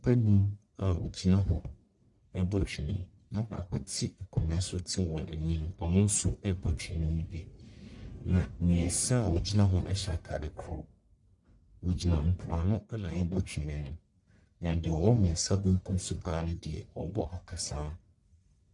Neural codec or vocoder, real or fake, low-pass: codec, 44.1 kHz, 1.7 kbps, Pupu-Codec; fake; 10.8 kHz